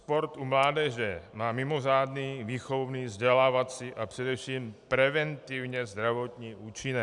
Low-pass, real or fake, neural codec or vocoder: 10.8 kHz; real; none